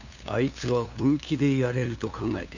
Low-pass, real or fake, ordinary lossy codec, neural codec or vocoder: 7.2 kHz; fake; none; codec, 16 kHz, 2 kbps, FunCodec, trained on LibriTTS, 25 frames a second